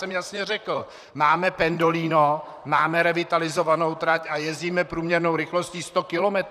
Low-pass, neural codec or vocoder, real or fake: 14.4 kHz; vocoder, 44.1 kHz, 128 mel bands, Pupu-Vocoder; fake